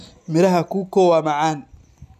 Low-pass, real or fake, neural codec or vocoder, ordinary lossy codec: 14.4 kHz; real; none; none